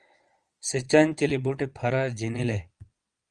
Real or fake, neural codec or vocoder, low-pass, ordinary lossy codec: fake; vocoder, 22.05 kHz, 80 mel bands, Vocos; 9.9 kHz; Opus, 32 kbps